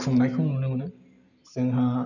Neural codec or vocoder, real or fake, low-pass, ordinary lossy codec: none; real; 7.2 kHz; none